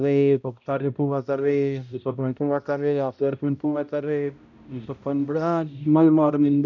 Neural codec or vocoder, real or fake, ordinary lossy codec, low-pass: codec, 16 kHz, 0.5 kbps, X-Codec, HuBERT features, trained on balanced general audio; fake; none; 7.2 kHz